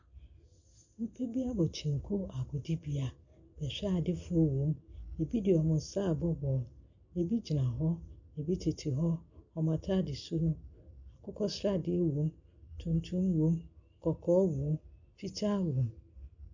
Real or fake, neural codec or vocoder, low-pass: real; none; 7.2 kHz